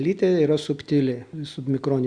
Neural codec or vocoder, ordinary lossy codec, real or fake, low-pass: none; Opus, 64 kbps; real; 9.9 kHz